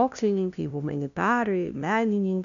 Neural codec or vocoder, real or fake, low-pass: codec, 16 kHz, 0.5 kbps, FunCodec, trained on LibriTTS, 25 frames a second; fake; 7.2 kHz